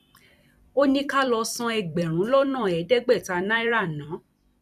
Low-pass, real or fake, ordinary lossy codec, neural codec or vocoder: 14.4 kHz; fake; none; vocoder, 44.1 kHz, 128 mel bands every 256 samples, BigVGAN v2